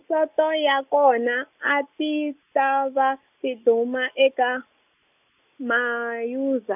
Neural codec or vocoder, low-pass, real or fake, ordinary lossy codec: none; 3.6 kHz; real; none